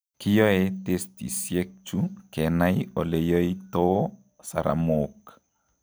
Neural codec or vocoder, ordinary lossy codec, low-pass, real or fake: none; none; none; real